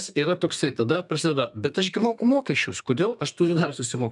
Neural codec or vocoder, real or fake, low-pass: codec, 32 kHz, 1.9 kbps, SNAC; fake; 10.8 kHz